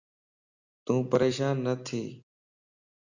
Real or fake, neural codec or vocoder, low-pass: real; none; 7.2 kHz